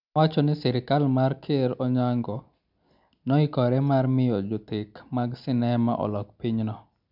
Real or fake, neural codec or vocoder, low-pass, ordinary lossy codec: real; none; 5.4 kHz; none